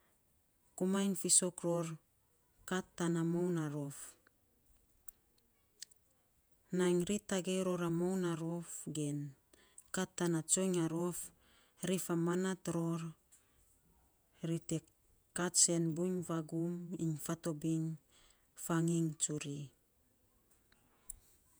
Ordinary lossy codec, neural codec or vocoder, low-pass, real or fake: none; vocoder, 48 kHz, 128 mel bands, Vocos; none; fake